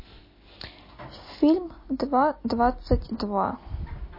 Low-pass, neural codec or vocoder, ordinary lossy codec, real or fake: 5.4 kHz; none; MP3, 24 kbps; real